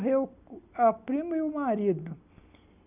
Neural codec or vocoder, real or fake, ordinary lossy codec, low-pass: none; real; none; 3.6 kHz